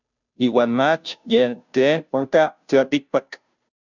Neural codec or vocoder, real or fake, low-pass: codec, 16 kHz, 0.5 kbps, FunCodec, trained on Chinese and English, 25 frames a second; fake; 7.2 kHz